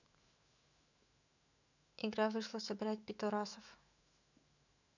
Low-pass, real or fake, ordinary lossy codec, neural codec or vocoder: 7.2 kHz; fake; none; autoencoder, 48 kHz, 128 numbers a frame, DAC-VAE, trained on Japanese speech